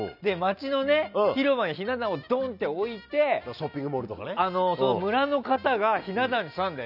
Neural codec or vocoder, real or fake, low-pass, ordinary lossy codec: none; real; 5.4 kHz; none